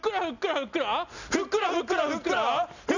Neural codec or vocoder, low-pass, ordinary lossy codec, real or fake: none; 7.2 kHz; none; real